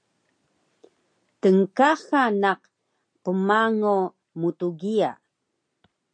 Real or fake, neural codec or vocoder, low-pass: real; none; 9.9 kHz